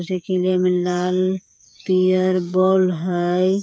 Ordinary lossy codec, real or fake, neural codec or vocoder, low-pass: none; fake; codec, 16 kHz, 8 kbps, FreqCodec, smaller model; none